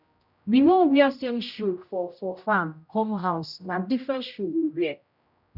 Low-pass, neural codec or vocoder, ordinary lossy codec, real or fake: 5.4 kHz; codec, 16 kHz, 0.5 kbps, X-Codec, HuBERT features, trained on general audio; none; fake